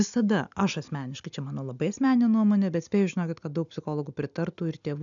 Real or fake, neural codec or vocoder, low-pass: real; none; 7.2 kHz